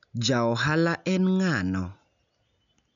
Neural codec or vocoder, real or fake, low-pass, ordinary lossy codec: none; real; 7.2 kHz; none